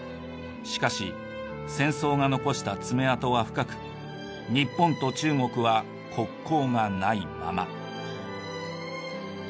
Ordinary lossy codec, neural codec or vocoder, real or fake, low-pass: none; none; real; none